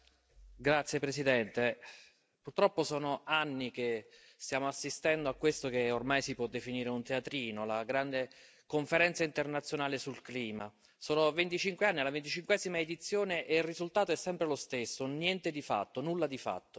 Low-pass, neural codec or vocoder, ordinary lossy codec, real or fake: none; none; none; real